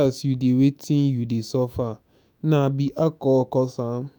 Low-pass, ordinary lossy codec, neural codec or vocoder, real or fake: none; none; autoencoder, 48 kHz, 128 numbers a frame, DAC-VAE, trained on Japanese speech; fake